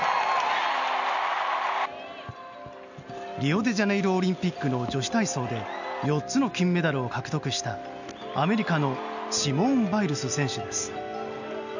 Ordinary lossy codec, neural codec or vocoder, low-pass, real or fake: none; none; 7.2 kHz; real